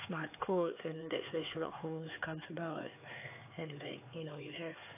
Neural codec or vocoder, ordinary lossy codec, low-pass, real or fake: codec, 16 kHz, 4 kbps, X-Codec, HuBERT features, trained on LibriSpeech; AAC, 24 kbps; 3.6 kHz; fake